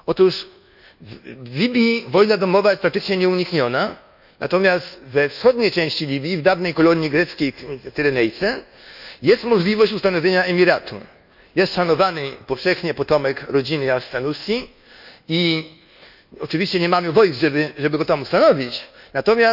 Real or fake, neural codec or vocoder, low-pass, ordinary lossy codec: fake; codec, 24 kHz, 1.2 kbps, DualCodec; 5.4 kHz; none